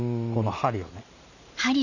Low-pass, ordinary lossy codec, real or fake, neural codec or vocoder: 7.2 kHz; none; fake; vocoder, 44.1 kHz, 128 mel bands every 512 samples, BigVGAN v2